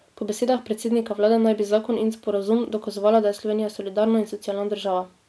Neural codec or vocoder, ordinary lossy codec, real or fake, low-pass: none; none; real; none